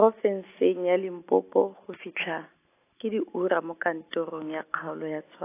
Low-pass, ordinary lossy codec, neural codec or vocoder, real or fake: 3.6 kHz; AAC, 24 kbps; none; real